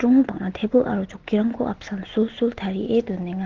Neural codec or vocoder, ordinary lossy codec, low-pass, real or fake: none; Opus, 16 kbps; 7.2 kHz; real